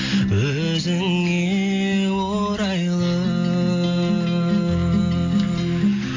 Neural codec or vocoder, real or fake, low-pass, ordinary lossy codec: none; real; 7.2 kHz; AAC, 32 kbps